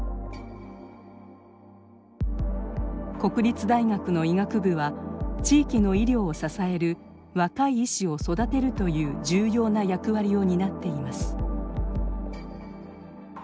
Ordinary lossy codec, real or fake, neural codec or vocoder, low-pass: none; real; none; none